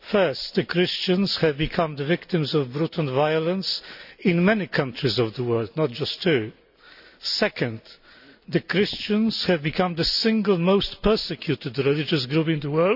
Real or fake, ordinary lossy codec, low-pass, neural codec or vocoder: real; none; 5.4 kHz; none